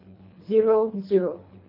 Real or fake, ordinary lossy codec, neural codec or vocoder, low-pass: fake; MP3, 32 kbps; codec, 24 kHz, 3 kbps, HILCodec; 5.4 kHz